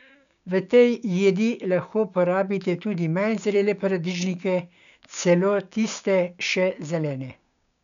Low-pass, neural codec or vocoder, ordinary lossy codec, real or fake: 7.2 kHz; codec, 16 kHz, 6 kbps, DAC; none; fake